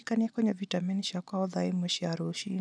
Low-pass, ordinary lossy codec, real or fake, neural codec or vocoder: 9.9 kHz; none; real; none